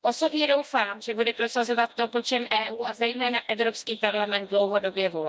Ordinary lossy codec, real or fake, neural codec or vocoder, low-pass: none; fake; codec, 16 kHz, 1 kbps, FreqCodec, smaller model; none